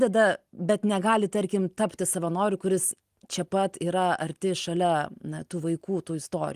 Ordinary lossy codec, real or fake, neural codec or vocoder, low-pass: Opus, 24 kbps; real; none; 14.4 kHz